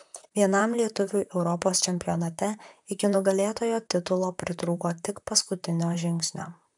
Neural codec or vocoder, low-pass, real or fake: vocoder, 44.1 kHz, 128 mel bands, Pupu-Vocoder; 10.8 kHz; fake